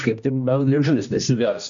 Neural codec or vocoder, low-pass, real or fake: codec, 16 kHz, 1 kbps, X-Codec, HuBERT features, trained on general audio; 7.2 kHz; fake